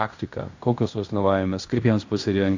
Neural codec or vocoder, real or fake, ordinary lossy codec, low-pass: codec, 16 kHz in and 24 kHz out, 0.9 kbps, LongCat-Audio-Codec, fine tuned four codebook decoder; fake; AAC, 48 kbps; 7.2 kHz